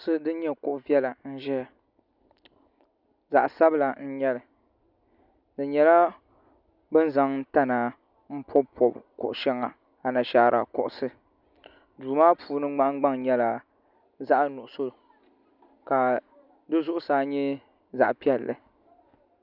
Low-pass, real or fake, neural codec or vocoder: 5.4 kHz; real; none